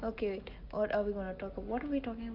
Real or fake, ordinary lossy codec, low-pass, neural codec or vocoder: real; Opus, 32 kbps; 5.4 kHz; none